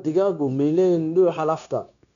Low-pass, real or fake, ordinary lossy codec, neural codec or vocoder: 7.2 kHz; fake; none; codec, 16 kHz, 0.9 kbps, LongCat-Audio-Codec